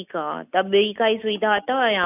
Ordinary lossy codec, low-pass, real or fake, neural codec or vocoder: none; 3.6 kHz; real; none